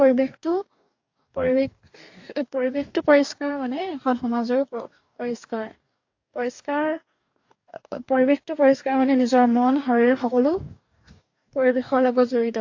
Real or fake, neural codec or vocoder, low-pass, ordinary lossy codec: fake; codec, 44.1 kHz, 2.6 kbps, DAC; 7.2 kHz; AAC, 48 kbps